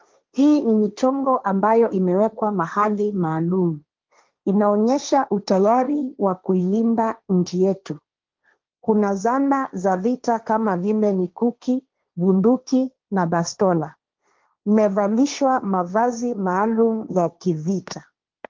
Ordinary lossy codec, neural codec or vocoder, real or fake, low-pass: Opus, 32 kbps; codec, 16 kHz, 1.1 kbps, Voila-Tokenizer; fake; 7.2 kHz